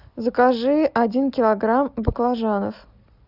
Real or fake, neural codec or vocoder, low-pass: real; none; 5.4 kHz